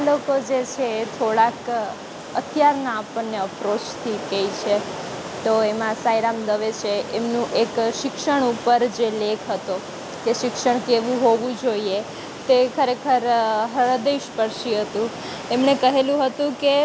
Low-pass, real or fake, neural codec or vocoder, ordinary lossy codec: none; real; none; none